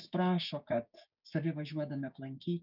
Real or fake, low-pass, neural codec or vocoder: fake; 5.4 kHz; codec, 16 kHz, 6 kbps, DAC